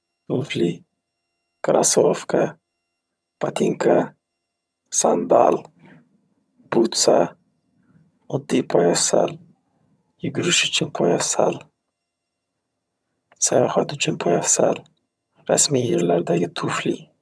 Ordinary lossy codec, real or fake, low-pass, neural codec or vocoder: none; fake; none; vocoder, 22.05 kHz, 80 mel bands, HiFi-GAN